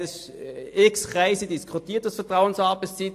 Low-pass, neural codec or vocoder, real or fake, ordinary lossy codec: 14.4 kHz; none; real; AAC, 64 kbps